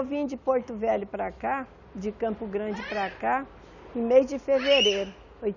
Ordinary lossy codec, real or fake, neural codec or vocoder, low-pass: none; real; none; 7.2 kHz